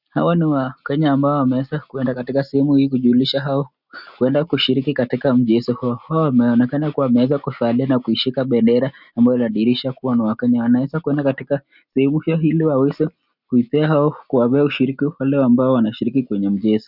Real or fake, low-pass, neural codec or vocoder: real; 5.4 kHz; none